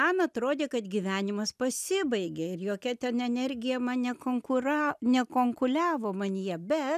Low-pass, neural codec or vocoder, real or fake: 14.4 kHz; none; real